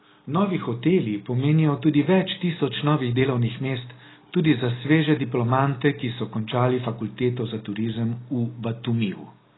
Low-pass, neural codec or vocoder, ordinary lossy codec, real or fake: 7.2 kHz; none; AAC, 16 kbps; real